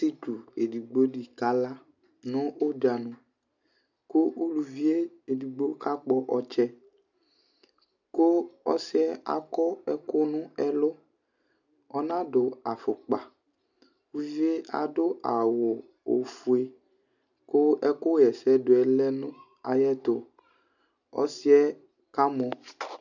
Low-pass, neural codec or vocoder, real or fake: 7.2 kHz; none; real